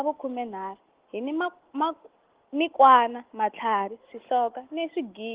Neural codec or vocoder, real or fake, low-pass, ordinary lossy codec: none; real; 3.6 kHz; Opus, 16 kbps